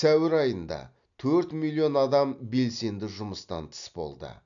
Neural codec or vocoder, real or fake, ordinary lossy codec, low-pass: none; real; none; 7.2 kHz